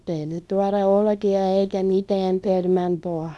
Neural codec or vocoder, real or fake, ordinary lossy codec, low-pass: codec, 24 kHz, 0.9 kbps, WavTokenizer, small release; fake; none; none